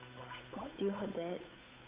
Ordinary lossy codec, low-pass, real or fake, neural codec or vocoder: Opus, 64 kbps; 3.6 kHz; fake; codec, 16 kHz, 8 kbps, FunCodec, trained on Chinese and English, 25 frames a second